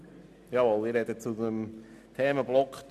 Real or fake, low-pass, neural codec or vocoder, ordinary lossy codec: real; 14.4 kHz; none; none